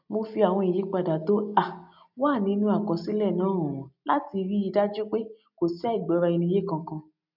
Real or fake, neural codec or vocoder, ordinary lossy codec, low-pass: real; none; none; 5.4 kHz